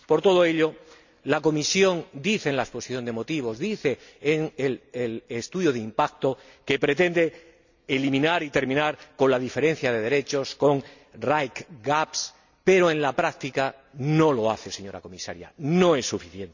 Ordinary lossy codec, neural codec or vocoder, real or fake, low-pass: none; none; real; 7.2 kHz